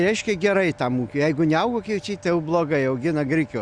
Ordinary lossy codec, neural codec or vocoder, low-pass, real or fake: Opus, 64 kbps; none; 9.9 kHz; real